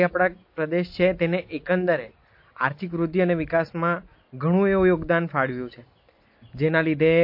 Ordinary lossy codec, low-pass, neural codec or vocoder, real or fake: MP3, 32 kbps; 5.4 kHz; none; real